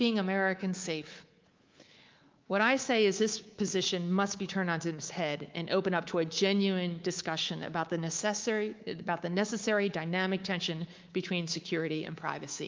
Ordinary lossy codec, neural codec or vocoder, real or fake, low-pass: Opus, 24 kbps; codec, 24 kHz, 3.1 kbps, DualCodec; fake; 7.2 kHz